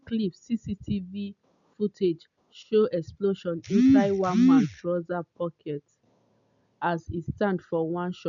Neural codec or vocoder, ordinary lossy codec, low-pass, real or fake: none; none; 7.2 kHz; real